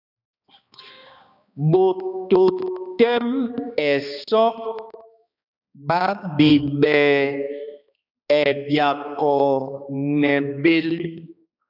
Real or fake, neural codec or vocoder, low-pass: fake; codec, 16 kHz, 2 kbps, X-Codec, HuBERT features, trained on balanced general audio; 5.4 kHz